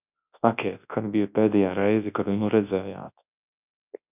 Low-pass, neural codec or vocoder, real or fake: 3.6 kHz; codec, 24 kHz, 0.9 kbps, WavTokenizer, large speech release; fake